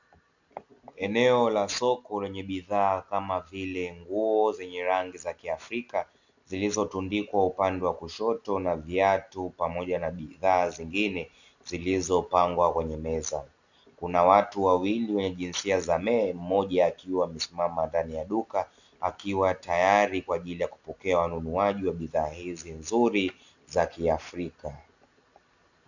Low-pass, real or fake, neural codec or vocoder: 7.2 kHz; real; none